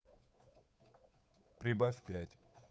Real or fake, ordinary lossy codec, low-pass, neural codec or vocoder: fake; none; none; codec, 16 kHz, 8 kbps, FunCodec, trained on Chinese and English, 25 frames a second